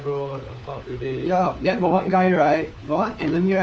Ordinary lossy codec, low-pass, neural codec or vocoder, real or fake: none; none; codec, 16 kHz, 4 kbps, FunCodec, trained on LibriTTS, 50 frames a second; fake